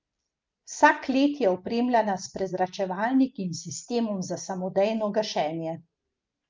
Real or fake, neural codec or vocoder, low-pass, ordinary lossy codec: real; none; 7.2 kHz; Opus, 24 kbps